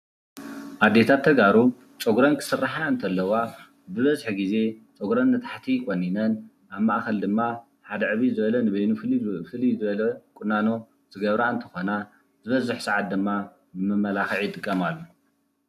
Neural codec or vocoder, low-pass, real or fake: none; 14.4 kHz; real